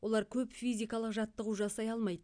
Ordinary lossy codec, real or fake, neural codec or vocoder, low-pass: none; real; none; 9.9 kHz